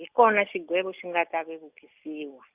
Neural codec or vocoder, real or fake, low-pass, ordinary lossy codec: none; real; 3.6 kHz; Opus, 64 kbps